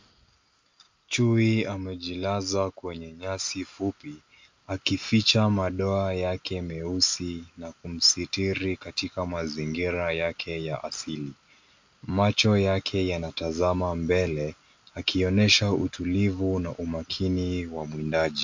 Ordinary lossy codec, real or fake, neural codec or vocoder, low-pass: MP3, 48 kbps; real; none; 7.2 kHz